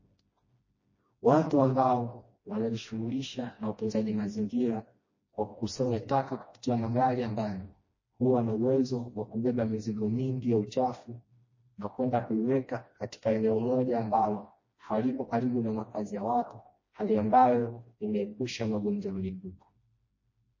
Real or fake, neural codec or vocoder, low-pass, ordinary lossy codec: fake; codec, 16 kHz, 1 kbps, FreqCodec, smaller model; 7.2 kHz; MP3, 32 kbps